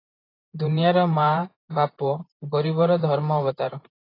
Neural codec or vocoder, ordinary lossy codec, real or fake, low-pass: vocoder, 44.1 kHz, 128 mel bands every 512 samples, BigVGAN v2; AAC, 24 kbps; fake; 5.4 kHz